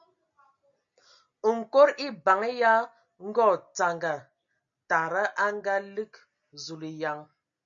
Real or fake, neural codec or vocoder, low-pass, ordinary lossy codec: real; none; 7.2 kHz; MP3, 64 kbps